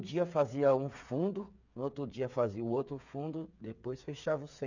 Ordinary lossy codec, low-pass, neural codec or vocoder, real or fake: none; 7.2 kHz; codec, 16 kHz, 8 kbps, FreqCodec, smaller model; fake